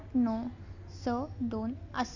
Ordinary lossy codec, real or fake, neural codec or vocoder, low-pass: none; real; none; 7.2 kHz